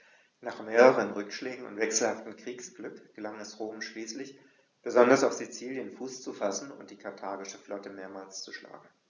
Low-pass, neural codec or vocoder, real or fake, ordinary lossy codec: 7.2 kHz; none; real; none